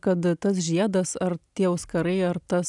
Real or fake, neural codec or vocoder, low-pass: real; none; 10.8 kHz